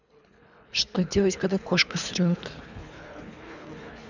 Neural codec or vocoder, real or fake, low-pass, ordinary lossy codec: codec, 24 kHz, 3 kbps, HILCodec; fake; 7.2 kHz; none